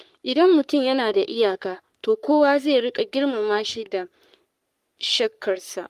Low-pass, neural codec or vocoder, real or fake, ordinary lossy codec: 14.4 kHz; codec, 44.1 kHz, 3.4 kbps, Pupu-Codec; fake; Opus, 32 kbps